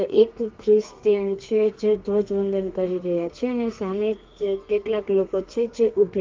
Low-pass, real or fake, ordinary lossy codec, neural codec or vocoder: 7.2 kHz; fake; Opus, 32 kbps; codec, 32 kHz, 1.9 kbps, SNAC